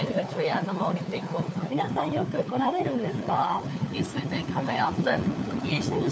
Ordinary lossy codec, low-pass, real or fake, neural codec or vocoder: none; none; fake; codec, 16 kHz, 4 kbps, FunCodec, trained on LibriTTS, 50 frames a second